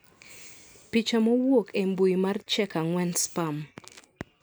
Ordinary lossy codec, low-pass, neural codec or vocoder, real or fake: none; none; none; real